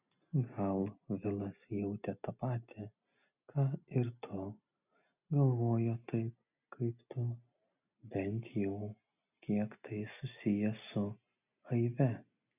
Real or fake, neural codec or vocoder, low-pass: real; none; 3.6 kHz